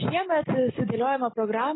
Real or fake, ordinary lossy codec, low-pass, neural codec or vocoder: real; AAC, 16 kbps; 7.2 kHz; none